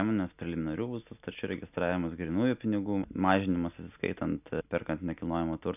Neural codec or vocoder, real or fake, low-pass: none; real; 3.6 kHz